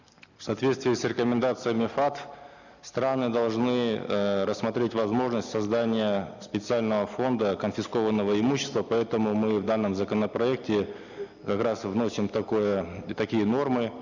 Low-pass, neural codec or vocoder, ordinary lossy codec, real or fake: 7.2 kHz; none; none; real